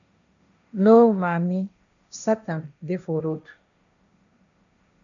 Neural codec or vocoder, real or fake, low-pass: codec, 16 kHz, 1.1 kbps, Voila-Tokenizer; fake; 7.2 kHz